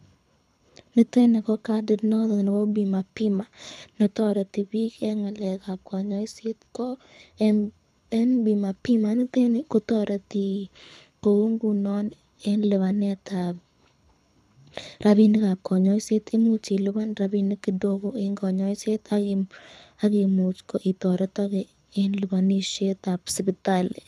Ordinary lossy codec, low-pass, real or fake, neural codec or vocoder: none; none; fake; codec, 24 kHz, 6 kbps, HILCodec